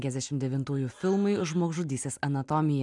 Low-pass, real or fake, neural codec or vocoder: 10.8 kHz; real; none